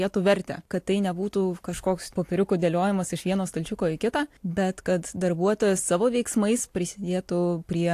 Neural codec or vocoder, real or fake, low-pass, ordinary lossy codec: none; real; 14.4 kHz; AAC, 64 kbps